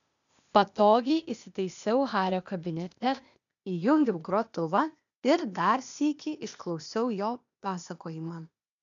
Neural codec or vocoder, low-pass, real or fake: codec, 16 kHz, 0.8 kbps, ZipCodec; 7.2 kHz; fake